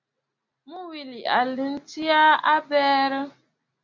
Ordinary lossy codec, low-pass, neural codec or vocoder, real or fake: MP3, 64 kbps; 7.2 kHz; none; real